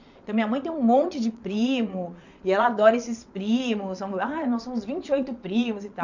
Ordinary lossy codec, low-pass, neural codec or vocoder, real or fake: none; 7.2 kHz; vocoder, 22.05 kHz, 80 mel bands, Vocos; fake